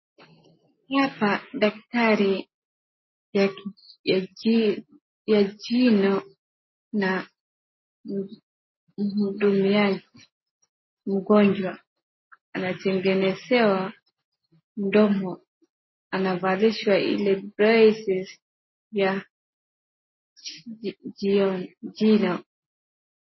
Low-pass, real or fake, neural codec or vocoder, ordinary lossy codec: 7.2 kHz; real; none; MP3, 24 kbps